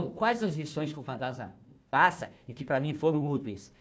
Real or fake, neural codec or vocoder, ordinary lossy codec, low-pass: fake; codec, 16 kHz, 1 kbps, FunCodec, trained on Chinese and English, 50 frames a second; none; none